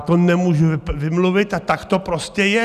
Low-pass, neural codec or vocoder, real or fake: 14.4 kHz; none; real